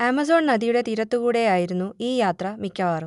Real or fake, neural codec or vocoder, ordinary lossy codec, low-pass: real; none; none; 10.8 kHz